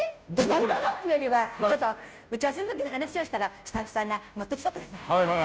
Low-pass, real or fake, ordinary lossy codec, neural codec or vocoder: none; fake; none; codec, 16 kHz, 0.5 kbps, FunCodec, trained on Chinese and English, 25 frames a second